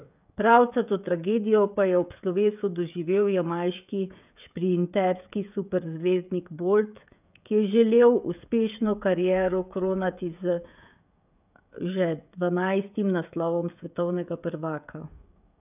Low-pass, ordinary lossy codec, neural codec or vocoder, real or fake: 3.6 kHz; none; codec, 16 kHz, 16 kbps, FreqCodec, smaller model; fake